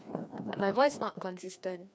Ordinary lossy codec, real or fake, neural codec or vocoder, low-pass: none; fake; codec, 16 kHz, 2 kbps, FreqCodec, larger model; none